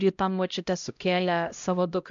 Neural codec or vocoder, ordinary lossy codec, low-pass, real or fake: codec, 16 kHz, 0.5 kbps, X-Codec, HuBERT features, trained on LibriSpeech; MP3, 64 kbps; 7.2 kHz; fake